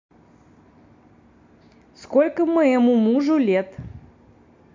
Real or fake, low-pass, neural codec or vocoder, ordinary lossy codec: real; 7.2 kHz; none; MP3, 48 kbps